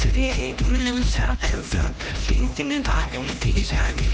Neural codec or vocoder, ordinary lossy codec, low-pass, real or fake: codec, 16 kHz, 1 kbps, X-Codec, HuBERT features, trained on LibriSpeech; none; none; fake